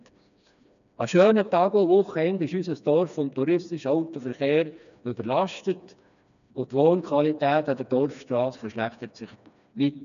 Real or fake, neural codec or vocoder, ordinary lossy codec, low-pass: fake; codec, 16 kHz, 2 kbps, FreqCodec, smaller model; none; 7.2 kHz